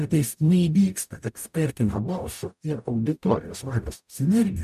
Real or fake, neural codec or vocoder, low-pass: fake; codec, 44.1 kHz, 0.9 kbps, DAC; 14.4 kHz